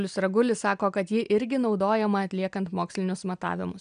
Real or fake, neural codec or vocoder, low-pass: real; none; 9.9 kHz